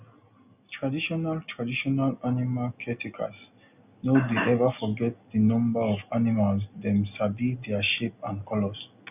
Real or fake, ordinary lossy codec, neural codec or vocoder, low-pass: real; none; none; 3.6 kHz